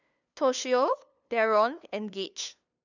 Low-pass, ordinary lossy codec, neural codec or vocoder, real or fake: 7.2 kHz; none; codec, 16 kHz, 2 kbps, FunCodec, trained on LibriTTS, 25 frames a second; fake